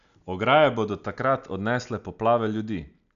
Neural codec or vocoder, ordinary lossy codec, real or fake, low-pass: none; none; real; 7.2 kHz